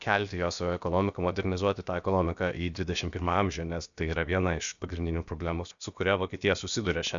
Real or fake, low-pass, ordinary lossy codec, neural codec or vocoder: fake; 7.2 kHz; Opus, 64 kbps; codec, 16 kHz, about 1 kbps, DyCAST, with the encoder's durations